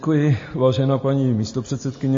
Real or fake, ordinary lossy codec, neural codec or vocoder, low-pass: real; MP3, 32 kbps; none; 7.2 kHz